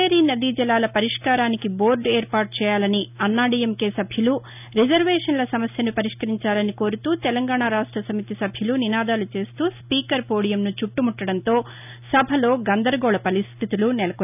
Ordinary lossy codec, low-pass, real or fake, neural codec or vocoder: none; 3.6 kHz; real; none